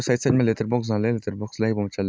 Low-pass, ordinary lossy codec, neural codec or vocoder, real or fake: none; none; none; real